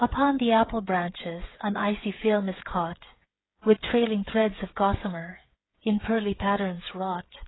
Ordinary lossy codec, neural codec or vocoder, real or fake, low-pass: AAC, 16 kbps; codec, 16 kHz, 8 kbps, FreqCodec, smaller model; fake; 7.2 kHz